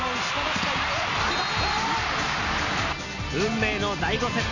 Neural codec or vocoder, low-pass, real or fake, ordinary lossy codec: none; 7.2 kHz; real; none